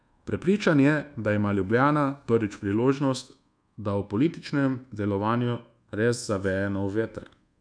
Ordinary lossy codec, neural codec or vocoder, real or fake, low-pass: none; codec, 24 kHz, 1.2 kbps, DualCodec; fake; 9.9 kHz